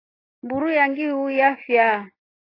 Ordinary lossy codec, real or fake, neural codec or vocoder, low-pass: AAC, 24 kbps; real; none; 5.4 kHz